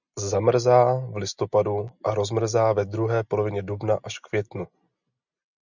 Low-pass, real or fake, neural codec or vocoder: 7.2 kHz; real; none